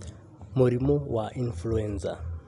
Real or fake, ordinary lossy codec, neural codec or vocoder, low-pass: real; none; none; 10.8 kHz